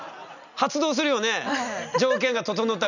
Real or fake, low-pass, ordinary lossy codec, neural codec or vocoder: real; 7.2 kHz; none; none